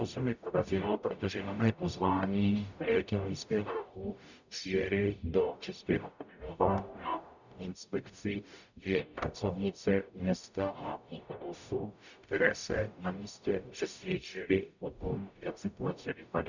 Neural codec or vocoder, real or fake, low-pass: codec, 44.1 kHz, 0.9 kbps, DAC; fake; 7.2 kHz